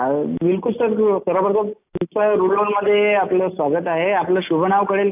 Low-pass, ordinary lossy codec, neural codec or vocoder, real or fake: 3.6 kHz; AAC, 32 kbps; none; real